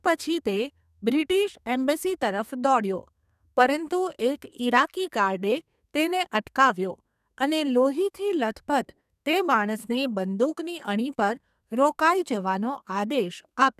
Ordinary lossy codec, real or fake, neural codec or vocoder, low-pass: none; fake; codec, 32 kHz, 1.9 kbps, SNAC; 14.4 kHz